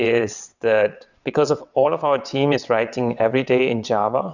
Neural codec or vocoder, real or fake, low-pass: vocoder, 22.05 kHz, 80 mel bands, WaveNeXt; fake; 7.2 kHz